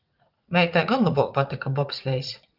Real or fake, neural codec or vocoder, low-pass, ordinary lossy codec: fake; vocoder, 44.1 kHz, 80 mel bands, Vocos; 5.4 kHz; Opus, 24 kbps